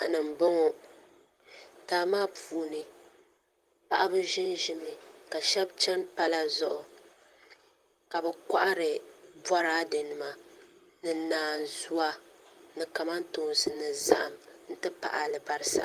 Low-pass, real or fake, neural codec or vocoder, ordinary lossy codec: 14.4 kHz; fake; vocoder, 44.1 kHz, 128 mel bands, Pupu-Vocoder; Opus, 32 kbps